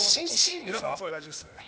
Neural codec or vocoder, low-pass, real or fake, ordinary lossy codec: codec, 16 kHz, 0.8 kbps, ZipCodec; none; fake; none